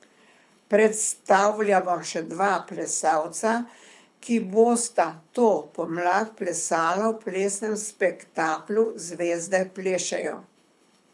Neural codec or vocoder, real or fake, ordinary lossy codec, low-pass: codec, 24 kHz, 6 kbps, HILCodec; fake; none; none